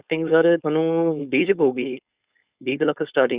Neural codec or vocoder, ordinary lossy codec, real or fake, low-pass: codec, 16 kHz, 4.8 kbps, FACodec; Opus, 64 kbps; fake; 3.6 kHz